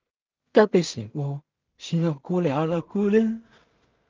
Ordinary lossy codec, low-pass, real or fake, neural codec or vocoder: Opus, 24 kbps; 7.2 kHz; fake; codec, 16 kHz in and 24 kHz out, 0.4 kbps, LongCat-Audio-Codec, two codebook decoder